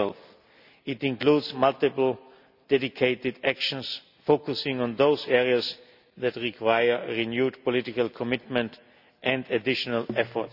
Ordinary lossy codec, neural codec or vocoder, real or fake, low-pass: none; none; real; 5.4 kHz